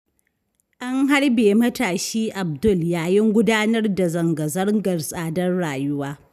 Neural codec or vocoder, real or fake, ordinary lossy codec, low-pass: none; real; none; 14.4 kHz